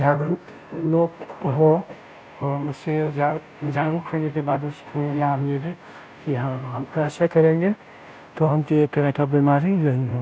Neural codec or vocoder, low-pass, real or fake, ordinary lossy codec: codec, 16 kHz, 0.5 kbps, FunCodec, trained on Chinese and English, 25 frames a second; none; fake; none